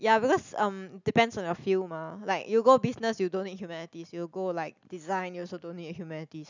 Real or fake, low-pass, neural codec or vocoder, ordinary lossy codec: real; 7.2 kHz; none; none